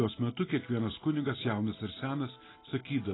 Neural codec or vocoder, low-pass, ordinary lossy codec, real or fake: none; 7.2 kHz; AAC, 16 kbps; real